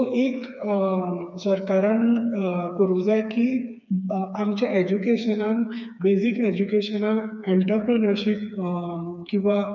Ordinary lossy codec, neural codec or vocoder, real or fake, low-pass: none; codec, 16 kHz, 4 kbps, FreqCodec, smaller model; fake; 7.2 kHz